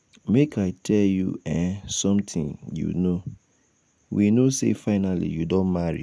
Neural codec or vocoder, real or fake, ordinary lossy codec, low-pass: none; real; none; none